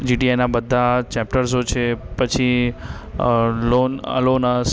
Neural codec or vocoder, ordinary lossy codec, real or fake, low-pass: none; none; real; none